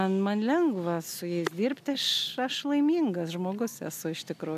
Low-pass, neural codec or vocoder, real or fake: 14.4 kHz; none; real